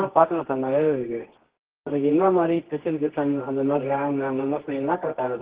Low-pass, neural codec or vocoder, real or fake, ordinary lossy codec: 3.6 kHz; codec, 24 kHz, 0.9 kbps, WavTokenizer, medium music audio release; fake; Opus, 16 kbps